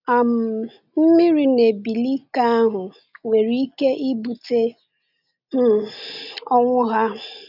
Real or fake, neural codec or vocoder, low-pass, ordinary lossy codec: real; none; 5.4 kHz; none